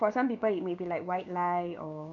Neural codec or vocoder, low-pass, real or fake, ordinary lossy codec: codec, 16 kHz, 6 kbps, DAC; 7.2 kHz; fake; none